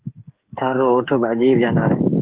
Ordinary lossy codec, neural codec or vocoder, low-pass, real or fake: Opus, 16 kbps; codec, 16 kHz, 8 kbps, FreqCodec, smaller model; 3.6 kHz; fake